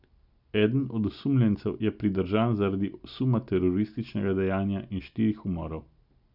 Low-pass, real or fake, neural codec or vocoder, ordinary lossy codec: 5.4 kHz; real; none; none